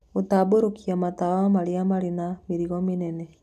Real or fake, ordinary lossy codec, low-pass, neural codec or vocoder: real; none; 14.4 kHz; none